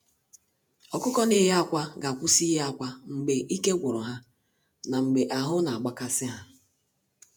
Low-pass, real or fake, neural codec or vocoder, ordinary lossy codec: none; fake; vocoder, 48 kHz, 128 mel bands, Vocos; none